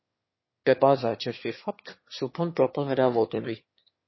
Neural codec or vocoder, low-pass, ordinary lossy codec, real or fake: autoencoder, 22.05 kHz, a latent of 192 numbers a frame, VITS, trained on one speaker; 7.2 kHz; MP3, 24 kbps; fake